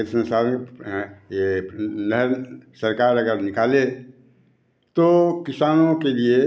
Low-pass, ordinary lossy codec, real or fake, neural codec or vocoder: none; none; real; none